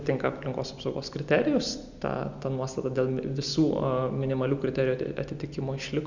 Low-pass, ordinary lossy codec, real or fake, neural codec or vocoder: 7.2 kHz; Opus, 64 kbps; real; none